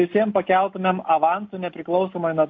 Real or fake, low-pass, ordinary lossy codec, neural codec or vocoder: real; 7.2 kHz; AAC, 48 kbps; none